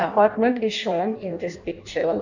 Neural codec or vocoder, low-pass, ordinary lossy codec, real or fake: codec, 16 kHz in and 24 kHz out, 0.6 kbps, FireRedTTS-2 codec; 7.2 kHz; none; fake